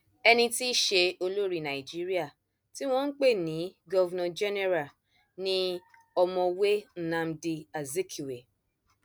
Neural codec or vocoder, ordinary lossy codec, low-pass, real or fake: none; none; none; real